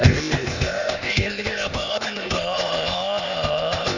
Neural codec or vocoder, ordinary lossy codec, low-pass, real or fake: codec, 16 kHz, 0.8 kbps, ZipCodec; none; 7.2 kHz; fake